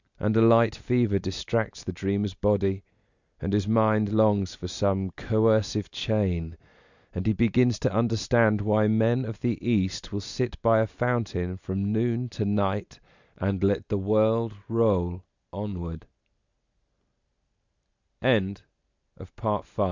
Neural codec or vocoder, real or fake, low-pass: none; real; 7.2 kHz